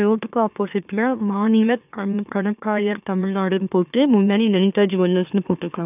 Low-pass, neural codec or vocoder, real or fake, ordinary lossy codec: 3.6 kHz; autoencoder, 44.1 kHz, a latent of 192 numbers a frame, MeloTTS; fake; none